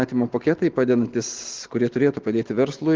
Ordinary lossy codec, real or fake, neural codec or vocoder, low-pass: Opus, 16 kbps; real; none; 7.2 kHz